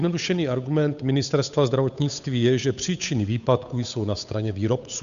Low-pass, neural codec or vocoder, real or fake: 7.2 kHz; codec, 16 kHz, 8 kbps, FunCodec, trained on Chinese and English, 25 frames a second; fake